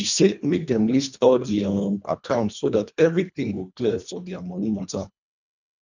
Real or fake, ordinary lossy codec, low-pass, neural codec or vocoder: fake; none; 7.2 kHz; codec, 24 kHz, 1.5 kbps, HILCodec